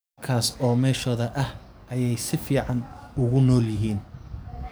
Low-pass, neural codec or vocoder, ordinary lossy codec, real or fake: none; codec, 44.1 kHz, 7.8 kbps, DAC; none; fake